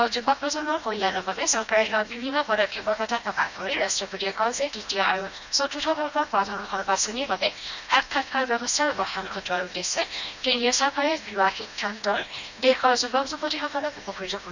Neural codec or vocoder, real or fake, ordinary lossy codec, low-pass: codec, 16 kHz, 1 kbps, FreqCodec, smaller model; fake; none; 7.2 kHz